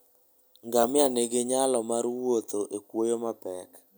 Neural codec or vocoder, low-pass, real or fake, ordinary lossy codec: none; none; real; none